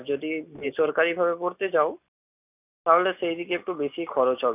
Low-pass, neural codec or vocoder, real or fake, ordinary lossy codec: 3.6 kHz; none; real; none